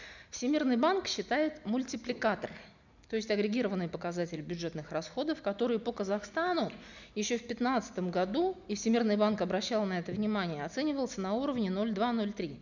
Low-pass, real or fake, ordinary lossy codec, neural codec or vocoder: 7.2 kHz; real; none; none